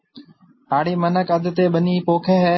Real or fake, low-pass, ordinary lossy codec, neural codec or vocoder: real; 7.2 kHz; MP3, 24 kbps; none